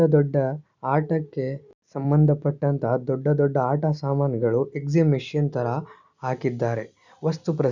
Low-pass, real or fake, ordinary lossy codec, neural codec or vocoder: 7.2 kHz; real; none; none